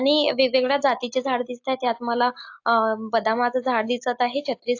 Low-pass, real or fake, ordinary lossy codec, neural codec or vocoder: 7.2 kHz; real; AAC, 48 kbps; none